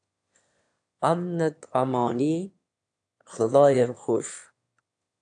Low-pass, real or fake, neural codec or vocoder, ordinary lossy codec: 9.9 kHz; fake; autoencoder, 22.05 kHz, a latent of 192 numbers a frame, VITS, trained on one speaker; AAC, 64 kbps